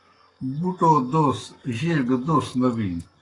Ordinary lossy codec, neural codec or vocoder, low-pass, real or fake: AAC, 32 kbps; codec, 44.1 kHz, 7.8 kbps, Pupu-Codec; 10.8 kHz; fake